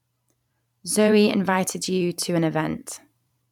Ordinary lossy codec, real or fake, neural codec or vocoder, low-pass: none; fake; vocoder, 44.1 kHz, 128 mel bands every 512 samples, BigVGAN v2; 19.8 kHz